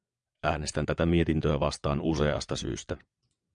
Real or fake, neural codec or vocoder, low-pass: fake; vocoder, 22.05 kHz, 80 mel bands, WaveNeXt; 9.9 kHz